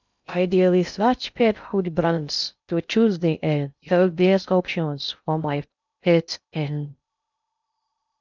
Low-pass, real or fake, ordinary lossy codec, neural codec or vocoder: 7.2 kHz; fake; none; codec, 16 kHz in and 24 kHz out, 0.6 kbps, FocalCodec, streaming, 2048 codes